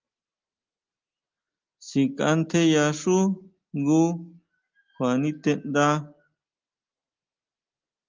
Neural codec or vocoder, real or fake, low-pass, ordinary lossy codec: none; real; 7.2 kHz; Opus, 32 kbps